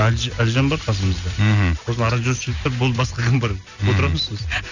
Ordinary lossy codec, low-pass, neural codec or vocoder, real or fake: none; 7.2 kHz; none; real